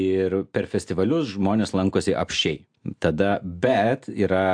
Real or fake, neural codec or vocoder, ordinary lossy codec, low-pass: real; none; AAC, 64 kbps; 9.9 kHz